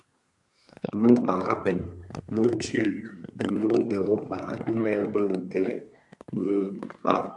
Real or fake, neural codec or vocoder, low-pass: fake; codec, 24 kHz, 1 kbps, SNAC; 10.8 kHz